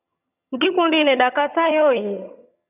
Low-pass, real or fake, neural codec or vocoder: 3.6 kHz; fake; vocoder, 22.05 kHz, 80 mel bands, HiFi-GAN